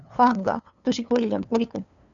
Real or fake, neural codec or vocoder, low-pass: fake; codec, 16 kHz, 2 kbps, FunCodec, trained on LibriTTS, 25 frames a second; 7.2 kHz